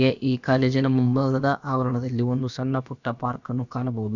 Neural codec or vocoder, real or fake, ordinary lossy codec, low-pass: codec, 16 kHz, about 1 kbps, DyCAST, with the encoder's durations; fake; none; 7.2 kHz